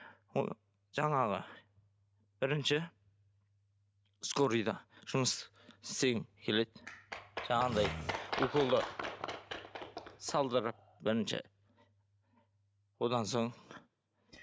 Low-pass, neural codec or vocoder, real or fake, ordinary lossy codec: none; none; real; none